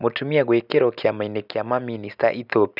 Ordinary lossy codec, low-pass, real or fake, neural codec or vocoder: none; 5.4 kHz; real; none